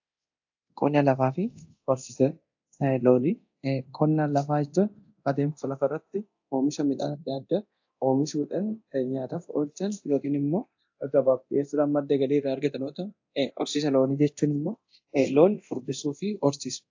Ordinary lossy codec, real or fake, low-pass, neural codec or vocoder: AAC, 48 kbps; fake; 7.2 kHz; codec, 24 kHz, 0.9 kbps, DualCodec